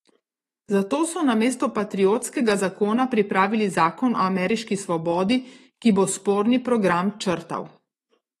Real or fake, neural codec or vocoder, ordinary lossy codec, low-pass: fake; autoencoder, 48 kHz, 128 numbers a frame, DAC-VAE, trained on Japanese speech; AAC, 32 kbps; 19.8 kHz